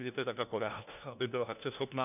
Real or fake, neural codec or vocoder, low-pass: fake; codec, 16 kHz, 1 kbps, FunCodec, trained on LibriTTS, 50 frames a second; 3.6 kHz